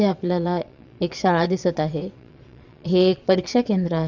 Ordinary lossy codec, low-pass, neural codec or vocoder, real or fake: none; 7.2 kHz; vocoder, 22.05 kHz, 80 mel bands, WaveNeXt; fake